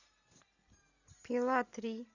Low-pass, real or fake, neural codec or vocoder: 7.2 kHz; real; none